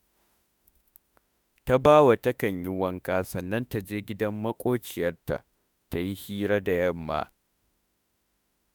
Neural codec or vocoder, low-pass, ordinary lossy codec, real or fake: autoencoder, 48 kHz, 32 numbers a frame, DAC-VAE, trained on Japanese speech; none; none; fake